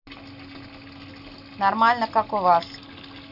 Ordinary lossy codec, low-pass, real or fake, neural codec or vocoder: none; 5.4 kHz; real; none